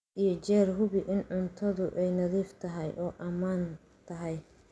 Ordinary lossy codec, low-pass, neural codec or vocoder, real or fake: none; none; none; real